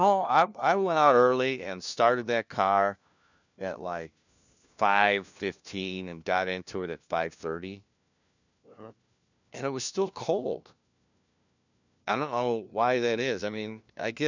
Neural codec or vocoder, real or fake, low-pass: codec, 16 kHz, 1 kbps, FunCodec, trained on LibriTTS, 50 frames a second; fake; 7.2 kHz